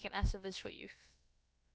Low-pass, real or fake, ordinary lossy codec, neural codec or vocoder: none; fake; none; codec, 16 kHz, about 1 kbps, DyCAST, with the encoder's durations